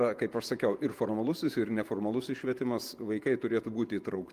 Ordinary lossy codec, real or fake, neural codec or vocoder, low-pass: Opus, 32 kbps; fake; vocoder, 48 kHz, 128 mel bands, Vocos; 14.4 kHz